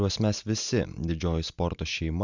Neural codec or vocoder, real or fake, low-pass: none; real; 7.2 kHz